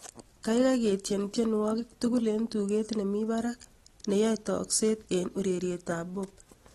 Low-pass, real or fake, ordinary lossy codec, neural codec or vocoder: 19.8 kHz; real; AAC, 32 kbps; none